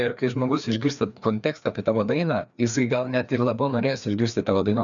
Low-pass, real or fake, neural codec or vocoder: 7.2 kHz; fake; codec, 16 kHz, 2 kbps, FreqCodec, larger model